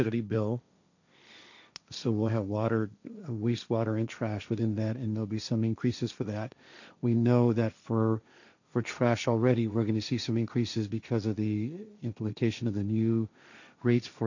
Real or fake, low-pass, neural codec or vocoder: fake; 7.2 kHz; codec, 16 kHz, 1.1 kbps, Voila-Tokenizer